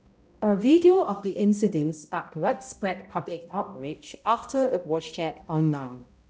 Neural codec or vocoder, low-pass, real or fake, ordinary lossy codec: codec, 16 kHz, 0.5 kbps, X-Codec, HuBERT features, trained on balanced general audio; none; fake; none